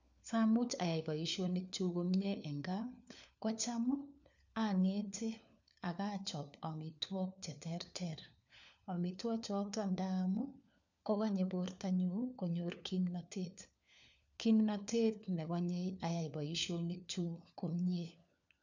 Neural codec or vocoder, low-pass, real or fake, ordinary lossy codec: codec, 16 kHz, 4 kbps, FunCodec, trained on LibriTTS, 50 frames a second; 7.2 kHz; fake; none